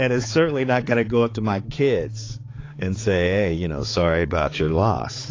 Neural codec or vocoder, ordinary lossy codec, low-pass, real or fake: codec, 16 kHz, 4 kbps, X-Codec, HuBERT features, trained on balanced general audio; AAC, 32 kbps; 7.2 kHz; fake